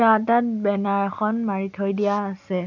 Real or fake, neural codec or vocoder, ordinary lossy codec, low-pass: real; none; none; 7.2 kHz